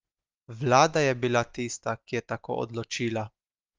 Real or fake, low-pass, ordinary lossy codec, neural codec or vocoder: real; 7.2 kHz; Opus, 32 kbps; none